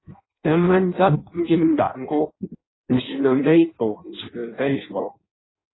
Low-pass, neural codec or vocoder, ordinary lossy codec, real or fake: 7.2 kHz; codec, 16 kHz in and 24 kHz out, 0.6 kbps, FireRedTTS-2 codec; AAC, 16 kbps; fake